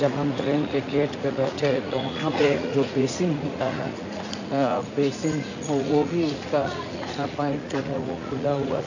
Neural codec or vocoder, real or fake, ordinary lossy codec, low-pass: vocoder, 44.1 kHz, 80 mel bands, Vocos; fake; none; 7.2 kHz